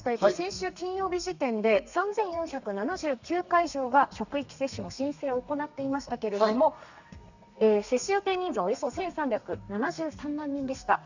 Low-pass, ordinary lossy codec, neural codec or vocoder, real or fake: 7.2 kHz; none; codec, 32 kHz, 1.9 kbps, SNAC; fake